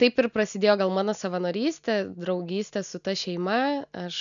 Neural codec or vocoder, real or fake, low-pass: none; real; 7.2 kHz